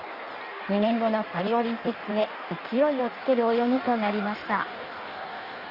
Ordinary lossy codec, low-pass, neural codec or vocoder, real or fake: Opus, 64 kbps; 5.4 kHz; codec, 16 kHz in and 24 kHz out, 1.1 kbps, FireRedTTS-2 codec; fake